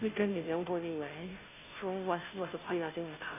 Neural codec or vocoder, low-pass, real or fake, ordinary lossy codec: codec, 16 kHz, 0.5 kbps, FunCodec, trained on Chinese and English, 25 frames a second; 3.6 kHz; fake; none